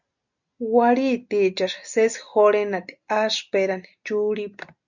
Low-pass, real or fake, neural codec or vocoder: 7.2 kHz; real; none